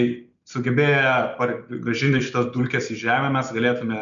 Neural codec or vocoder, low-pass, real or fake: none; 7.2 kHz; real